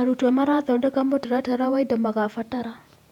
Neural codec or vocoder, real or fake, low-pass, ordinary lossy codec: vocoder, 48 kHz, 128 mel bands, Vocos; fake; 19.8 kHz; none